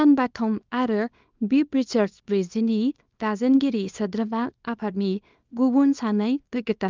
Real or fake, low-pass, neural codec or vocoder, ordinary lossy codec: fake; 7.2 kHz; codec, 24 kHz, 0.9 kbps, WavTokenizer, small release; Opus, 24 kbps